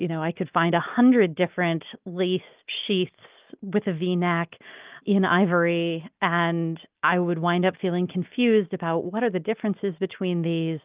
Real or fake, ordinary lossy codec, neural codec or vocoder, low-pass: real; Opus, 24 kbps; none; 3.6 kHz